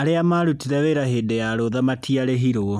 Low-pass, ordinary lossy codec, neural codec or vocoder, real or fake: 14.4 kHz; none; none; real